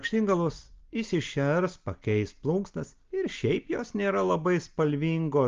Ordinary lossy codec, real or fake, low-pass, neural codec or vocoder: Opus, 32 kbps; real; 7.2 kHz; none